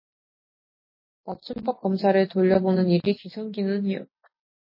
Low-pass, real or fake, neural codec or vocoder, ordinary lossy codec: 5.4 kHz; real; none; MP3, 24 kbps